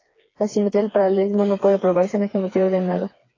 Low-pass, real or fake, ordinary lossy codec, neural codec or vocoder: 7.2 kHz; fake; AAC, 32 kbps; codec, 16 kHz, 4 kbps, FreqCodec, smaller model